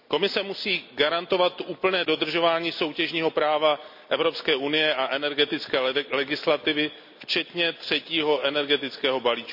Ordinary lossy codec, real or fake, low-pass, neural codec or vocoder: MP3, 48 kbps; real; 5.4 kHz; none